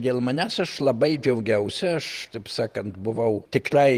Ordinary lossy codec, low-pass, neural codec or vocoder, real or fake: Opus, 24 kbps; 14.4 kHz; vocoder, 48 kHz, 128 mel bands, Vocos; fake